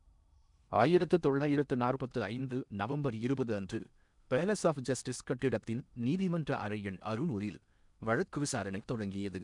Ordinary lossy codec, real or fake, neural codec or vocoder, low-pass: none; fake; codec, 16 kHz in and 24 kHz out, 0.8 kbps, FocalCodec, streaming, 65536 codes; 10.8 kHz